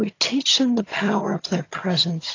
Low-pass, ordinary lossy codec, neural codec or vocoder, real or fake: 7.2 kHz; AAC, 32 kbps; vocoder, 22.05 kHz, 80 mel bands, HiFi-GAN; fake